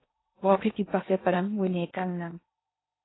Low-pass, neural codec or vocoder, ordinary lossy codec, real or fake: 7.2 kHz; codec, 16 kHz in and 24 kHz out, 0.8 kbps, FocalCodec, streaming, 65536 codes; AAC, 16 kbps; fake